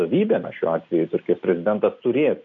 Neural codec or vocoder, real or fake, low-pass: none; real; 7.2 kHz